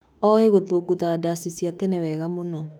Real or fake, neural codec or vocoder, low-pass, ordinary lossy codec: fake; autoencoder, 48 kHz, 32 numbers a frame, DAC-VAE, trained on Japanese speech; 19.8 kHz; none